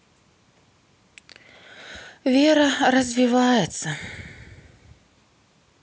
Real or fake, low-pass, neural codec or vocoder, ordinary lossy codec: real; none; none; none